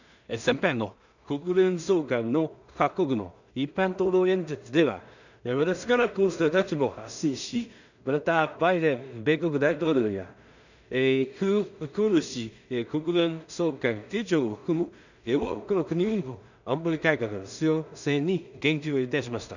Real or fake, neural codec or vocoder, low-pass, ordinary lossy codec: fake; codec, 16 kHz in and 24 kHz out, 0.4 kbps, LongCat-Audio-Codec, two codebook decoder; 7.2 kHz; none